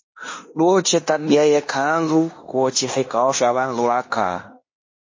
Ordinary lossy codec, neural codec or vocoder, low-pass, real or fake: MP3, 32 kbps; codec, 16 kHz in and 24 kHz out, 0.9 kbps, LongCat-Audio-Codec, fine tuned four codebook decoder; 7.2 kHz; fake